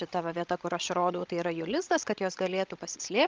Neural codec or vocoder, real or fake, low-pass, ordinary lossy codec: codec, 16 kHz, 16 kbps, FreqCodec, larger model; fake; 7.2 kHz; Opus, 32 kbps